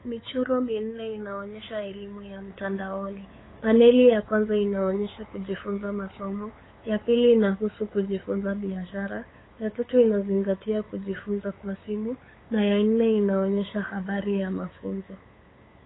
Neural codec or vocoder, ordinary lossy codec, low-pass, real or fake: codec, 16 kHz, 8 kbps, FunCodec, trained on LibriTTS, 25 frames a second; AAC, 16 kbps; 7.2 kHz; fake